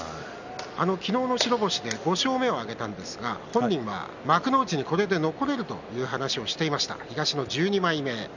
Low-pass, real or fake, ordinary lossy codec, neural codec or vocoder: 7.2 kHz; real; none; none